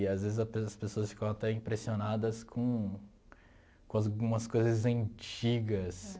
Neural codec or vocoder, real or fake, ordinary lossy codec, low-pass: none; real; none; none